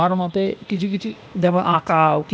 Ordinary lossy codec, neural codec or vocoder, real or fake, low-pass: none; codec, 16 kHz, 0.8 kbps, ZipCodec; fake; none